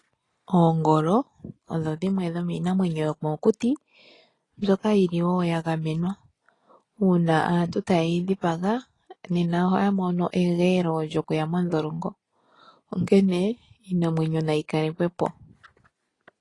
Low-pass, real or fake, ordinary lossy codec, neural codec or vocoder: 10.8 kHz; real; AAC, 32 kbps; none